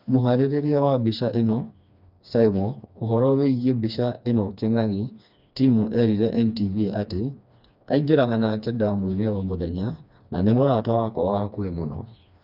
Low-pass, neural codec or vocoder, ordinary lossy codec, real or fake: 5.4 kHz; codec, 16 kHz, 2 kbps, FreqCodec, smaller model; none; fake